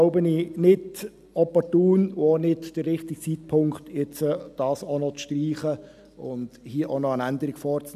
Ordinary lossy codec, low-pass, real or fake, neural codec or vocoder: none; 14.4 kHz; real; none